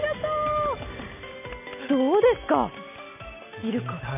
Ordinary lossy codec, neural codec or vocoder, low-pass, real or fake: none; none; 3.6 kHz; real